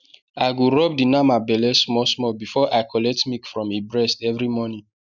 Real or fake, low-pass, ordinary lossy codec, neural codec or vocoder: real; 7.2 kHz; none; none